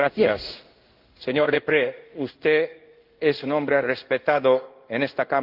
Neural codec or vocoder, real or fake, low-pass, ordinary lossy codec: codec, 16 kHz in and 24 kHz out, 1 kbps, XY-Tokenizer; fake; 5.4 kHz; Opus, 32 kbps